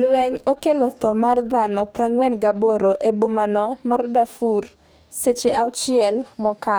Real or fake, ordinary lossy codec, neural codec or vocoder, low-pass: fake; none; codec, 44.1 kHz, 2.6 kbps, DAC; none